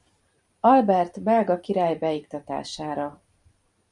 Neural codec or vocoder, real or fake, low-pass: vocoder, 44.1 kHz, 128 mel bands every 512 samples, BigVGAN v2; fake; 10.8 kHz